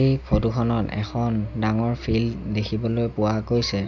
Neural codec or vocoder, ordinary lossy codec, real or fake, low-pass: none; none; real; 7.2 kHz